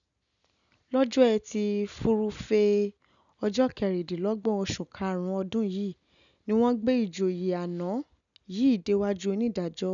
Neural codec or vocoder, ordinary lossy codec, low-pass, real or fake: none; none; 7.2 kHz; real